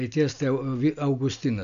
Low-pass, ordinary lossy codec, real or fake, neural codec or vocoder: 7.2 kHz; MP3, 96 kbps; real; none